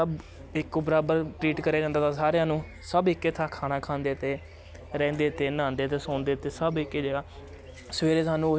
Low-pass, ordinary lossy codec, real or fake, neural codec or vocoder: none; none; real; none